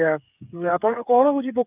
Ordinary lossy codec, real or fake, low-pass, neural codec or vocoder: none; fake; 3.6 kHz; codec, 16 kHz, 8 kbps, FreqCodec, smaller model